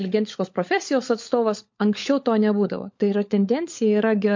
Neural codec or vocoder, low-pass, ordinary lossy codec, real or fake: codec, 16 kHz, 16 kbps, FunCodec, trained on LibriTTS, 50 frames a second; 7.2 kHz; MP3, 48 kbps; fake